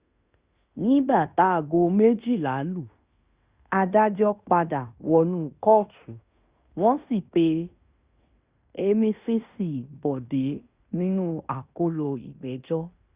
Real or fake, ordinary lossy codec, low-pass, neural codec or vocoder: fake; Opus, 64 kbps; 3.6 kHz; codec, 16 kHz in and 24 kHz out, 0.9 kbps, LongCat-Audio-Codec, fine tuned four codebook decoder